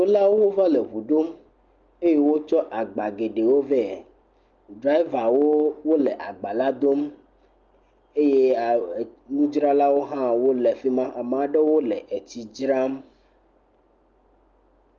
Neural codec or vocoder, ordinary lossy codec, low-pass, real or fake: none; Opus, 24 kbps; 7.2 kHz; real